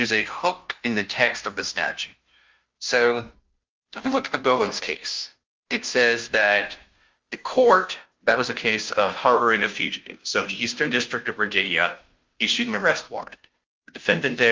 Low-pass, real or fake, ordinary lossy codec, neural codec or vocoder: 7.2 kHz; fake; Opus, 32 kbps; codec, 16 kHz, 0.5 kbps, FunCodec, trained on Chinese and English, 25 frames a second